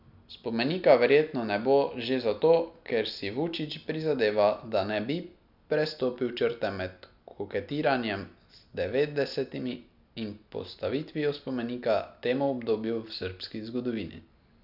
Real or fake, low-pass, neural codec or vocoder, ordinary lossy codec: real; 5.4 kHz; none; none